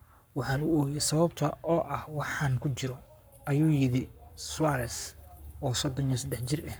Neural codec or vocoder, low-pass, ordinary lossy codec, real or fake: codec, 44.1 kHz, 7.8 kbps, Pupu-Codec; none; none; fake